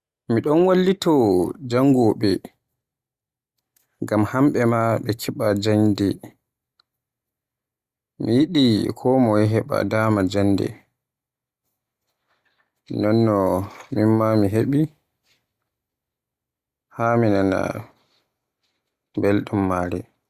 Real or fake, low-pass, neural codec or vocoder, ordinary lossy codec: real; 14.4 kHz; none; Opus, 64 kbps